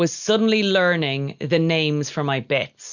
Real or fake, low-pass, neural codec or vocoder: real; 7.2 kHz; none